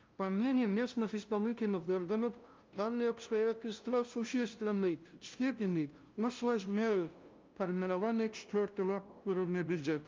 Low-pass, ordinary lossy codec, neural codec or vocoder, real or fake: 7.2 kHz; Opus, 24 kbps; codec, 16 kHz, 0.5 kbps, FunCodec, trained on LibriTTS, 25 frames a second; fake